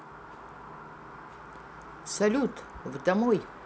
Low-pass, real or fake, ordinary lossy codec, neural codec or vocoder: none; real; none; none